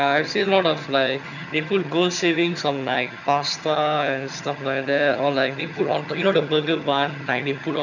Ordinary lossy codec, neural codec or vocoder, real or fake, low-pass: none; vocoder, 22.05 kHz, 80 mel bands, HiFi-GAN; fake; 7.2 kHz